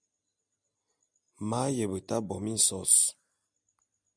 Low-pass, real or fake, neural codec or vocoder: 9.9 kHz; real; none